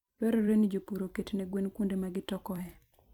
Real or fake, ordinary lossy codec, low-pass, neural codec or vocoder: fake; none; 19.8 kHz; vocoder, 44.1 kHz, 128 mel bands every 256 samples, BigVGAN v2